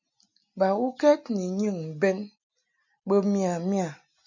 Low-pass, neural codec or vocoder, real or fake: 7.2 kHz; none; real